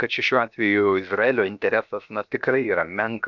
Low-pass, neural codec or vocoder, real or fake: 7.2 kHz; codec, 16 kHz, 0.7 kbps, FocalCodec; fake